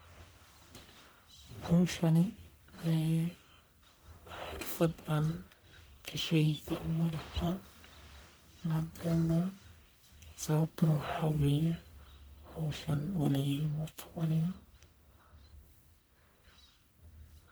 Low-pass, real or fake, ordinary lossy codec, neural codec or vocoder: none; fake; none; codec, 44.1 kHz, 1.7 kbps, Pupu-Codec